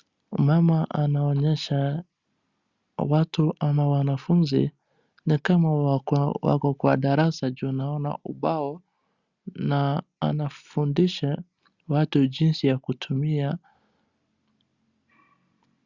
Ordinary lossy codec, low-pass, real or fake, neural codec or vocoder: Opus, 64 kbps; 7.2 kHz; real; none